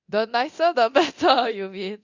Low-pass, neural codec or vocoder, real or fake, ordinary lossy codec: 7.2 kHz; codec, 24 kHz, 0.9 kbps, DualCodec; fake; Opus, 64 kbps